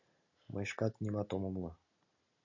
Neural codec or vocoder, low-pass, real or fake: none; 7.2 kHz; real